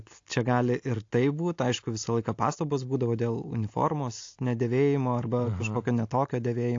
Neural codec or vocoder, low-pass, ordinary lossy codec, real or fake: none; 7.2 kHz; AAC, 48 kbps; real